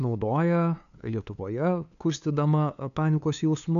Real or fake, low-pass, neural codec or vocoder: fake; 7.2 kHz; codec, 16 kHz, 2 kbps, FunCodec, trained on LibriTTS, 25 frames a second